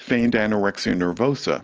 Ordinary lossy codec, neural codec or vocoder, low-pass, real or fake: Opus, 16 kbps; none; 7.2 kHz; real